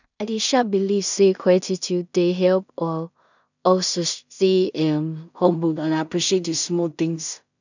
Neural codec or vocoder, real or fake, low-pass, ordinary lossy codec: codec, 16 kHz in and 24 kHz out, 0.4 kbps, LongCat-Audio-Codec, two codebook decoder; fake; 7.2 kHz; none